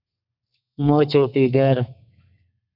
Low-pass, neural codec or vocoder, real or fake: 5.4 kHz; codec, 32 kHz, 1.9 kbps, SNAC; fake